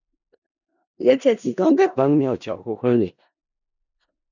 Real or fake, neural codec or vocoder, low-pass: fake; codec, 16 kHz in and 24 kHz out, 0.4 kbps, LongCat-Audio-Codec, four codebook decoder; 7.2 kHz